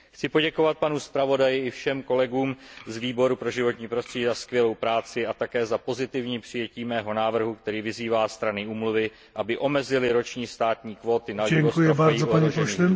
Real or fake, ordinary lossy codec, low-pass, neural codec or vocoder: real; none; none; none